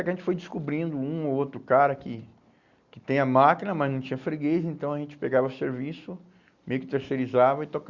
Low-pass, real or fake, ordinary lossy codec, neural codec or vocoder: 7.2 kHz; real; Opus, 64 kbps; none